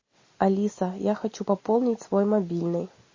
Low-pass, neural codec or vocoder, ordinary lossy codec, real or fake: 7.2 kHz; none; MP3, 32 kbps; real